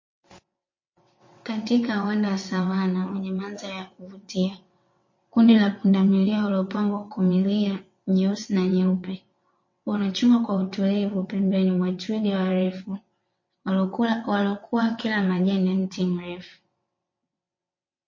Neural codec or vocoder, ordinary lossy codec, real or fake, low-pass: vocoder, 22.05 kHz, 80 mel bands, WaveNeXt; MP3, 32 kbps; fake; 7.2 kHz